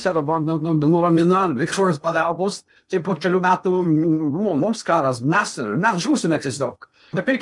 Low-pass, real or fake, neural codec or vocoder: 10.8 kHz; fake; codec, 16 kHz in and 24 kHz out, 0.8 kbps, FocalCodec, streaming, 65536 codes